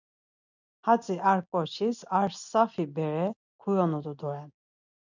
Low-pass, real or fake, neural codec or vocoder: 7.2 kHz; real; none